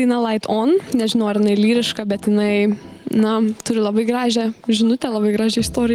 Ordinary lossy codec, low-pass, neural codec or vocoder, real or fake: Opus, 24 kbps; 19.8 kHz; none; real